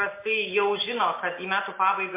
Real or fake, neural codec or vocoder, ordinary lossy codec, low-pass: real; none; MP3, 24 kbps; 3.6 kHz